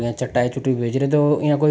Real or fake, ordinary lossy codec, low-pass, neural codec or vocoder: real; none; none; none